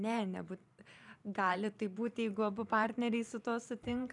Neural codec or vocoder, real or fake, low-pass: vocoder, 44.1 kHz, 128 mel bands every 512 samples, BigVGAN v2; fake; 10.8 kHz